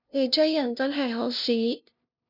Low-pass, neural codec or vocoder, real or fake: 5.4 kHz; codec, 16 kHz, 0.5 kbps, FunCodec, trained on LibriTTS, 25 frames a second; fake